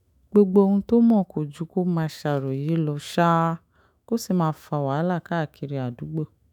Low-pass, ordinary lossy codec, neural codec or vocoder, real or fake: 19.8 kHz; none; autoencoder, 48 kHz, 128 numbers a frame, DAC-VAE, trained on Japanese speech; fake